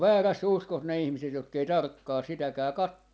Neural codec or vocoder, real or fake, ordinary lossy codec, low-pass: none; real; none; none